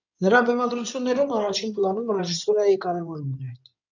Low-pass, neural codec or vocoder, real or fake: 7.2 kHz; codec, 16 kHz in and 24 kHz out, 2.2 kbps, FireRedTTS-2 codec; fake